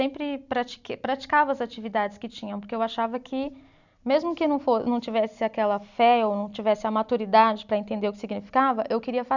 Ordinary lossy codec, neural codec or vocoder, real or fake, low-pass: none; none; real; 7.2 kHz